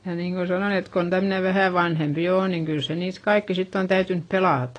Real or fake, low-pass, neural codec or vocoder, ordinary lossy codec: real; 9.9 kHz; none; AAC, 32 kbps